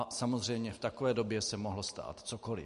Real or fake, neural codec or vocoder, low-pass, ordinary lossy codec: real; none; 14.4 kHz; MP3, 48 kbps